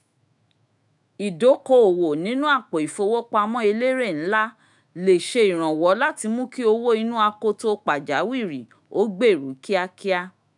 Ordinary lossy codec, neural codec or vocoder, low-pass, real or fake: none; autoencoder, 48 kHz, 128 numbers a frame, DAC-VAE, trained on Japanese speech; 10.8 kHz; fake